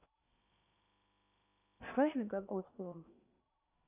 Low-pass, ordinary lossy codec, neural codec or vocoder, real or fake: 3.6 kHz; none; codec, 16 kHz in and 24 kHz out, 0.8 kbps, FocalCodec, streaming, 65536 codes; fake